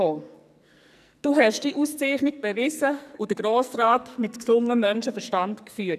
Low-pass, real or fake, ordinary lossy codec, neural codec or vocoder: 14.4 kHz; fake; none; codec, 44.1 kHz, 2.6 kbps, SNAC